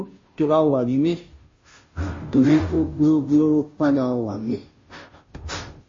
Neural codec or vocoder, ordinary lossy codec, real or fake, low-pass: codec, 16 kHz, 0.5 kbps, FunCodec, trained on Chinese and English, 25 frames a second; MP3, 32 kbps; fake; 7.2 kHz